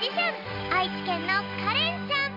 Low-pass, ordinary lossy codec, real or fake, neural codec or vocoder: 5.4 kHz; none; real; none